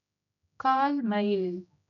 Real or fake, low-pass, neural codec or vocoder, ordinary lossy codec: fake; 7.2 kHz; codec, 16 kHz, 0.5 kbps, X-Codec, HuBERT features, trained on general audio; none